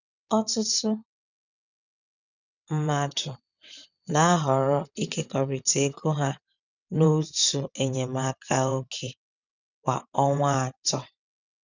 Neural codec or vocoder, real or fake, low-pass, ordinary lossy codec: vocoder, 22.05 kHz, 80 mel bands, WaveNeXt; fake; 7.2 kHz; none